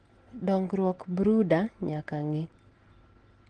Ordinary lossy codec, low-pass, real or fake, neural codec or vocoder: Opus, 16 kbps; 9.9 kHz; real; none